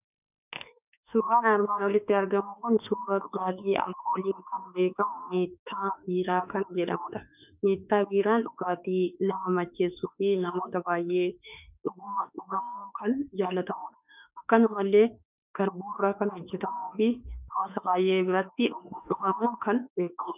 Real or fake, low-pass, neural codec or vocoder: fake; 3.6 kHz; autoencoder, 48 kHz, 32 numbers a frame, DAC-VAE, trained on Japanese speech